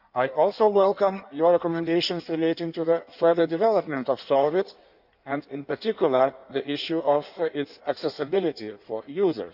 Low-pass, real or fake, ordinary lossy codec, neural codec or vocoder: 5.4 kHz; fake; none; codec, 16 kHz in and 24 kHz out, 1.1 kbps, FireRedTTS-2 codec